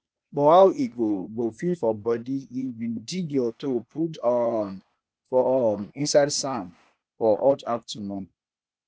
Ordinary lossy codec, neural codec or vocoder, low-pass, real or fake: none; codec, 16 kHz, 0.8 kbps, ZipCodec; none; fake